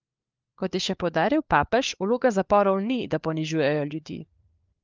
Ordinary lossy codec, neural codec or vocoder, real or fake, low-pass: Opus, 32 kbps; codec, 16 kHz, 4 kbps, FunCodec, trained on LibriTTS, 50 frames a second; fake; 7.2 kHz